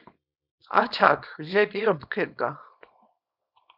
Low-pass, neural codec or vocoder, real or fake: 5.4 kHz; codec, 24 kHz, 0.9 kbps, WavTokenizer, small release; fake